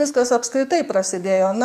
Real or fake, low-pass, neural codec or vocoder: fake; 14.4 kHz; codec, 44.1 kHz, 7.8 kbps, DAC